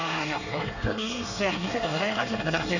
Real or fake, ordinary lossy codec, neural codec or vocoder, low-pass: fake; none; codec, 24 kHz, 1 kbps, SNAC; 7.2 kHz